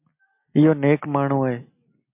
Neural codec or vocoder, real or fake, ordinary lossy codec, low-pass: none; real; MP3, 32 kbps; 3.6 kHz